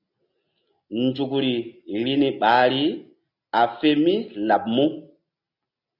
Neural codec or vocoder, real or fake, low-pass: none; real; 5.4 kHz